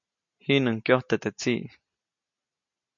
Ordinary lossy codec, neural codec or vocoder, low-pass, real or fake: MP3, 48 kbps; none; 7.2 kHz; real